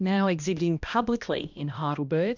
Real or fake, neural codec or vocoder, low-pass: fake; codec, 16 kHz, 1 kbps, X-Codec, HuBERT features, trained on balanced general audio; 7.2 kHz